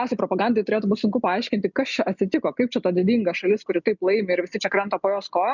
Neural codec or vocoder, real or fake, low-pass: none; real; 7.2 kHz